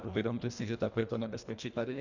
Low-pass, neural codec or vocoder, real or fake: 7.2 kHz; codec, 24 kHz, 1.5 kbps, HILCodec; fake